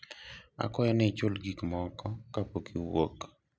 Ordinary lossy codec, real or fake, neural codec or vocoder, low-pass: none; real; none; none